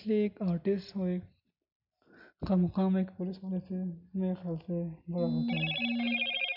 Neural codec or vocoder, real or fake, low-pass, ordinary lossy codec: none; real; 5.4 kHz; none